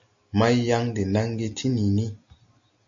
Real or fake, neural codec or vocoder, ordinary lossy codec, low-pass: real; none; MP3, 48 kbps; 7.2 kHz